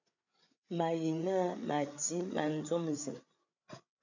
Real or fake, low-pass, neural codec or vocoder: fake; 7.2 kHz; codec, 16 kHz, 8 kbps, FreqCodec, larger model